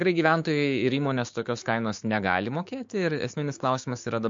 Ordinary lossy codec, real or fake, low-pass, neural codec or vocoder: MP3, 48 kbps; fake; 7.2 kHz; codec, 16 kHz, 6 kbps, DAC